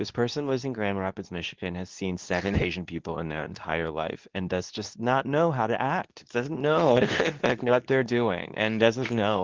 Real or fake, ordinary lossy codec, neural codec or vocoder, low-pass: fake; Opus, 32 kbps; codec, 24 kHz, 0.9 kbps, WavTokenizer, medium speech release version 2; 7.2 kHz